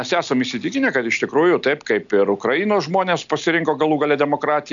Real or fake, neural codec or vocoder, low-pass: real; none; 7.2 kHz